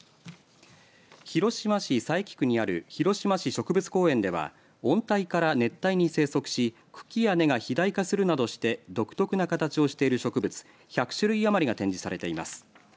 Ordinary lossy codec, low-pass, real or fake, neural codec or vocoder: none; none; real; none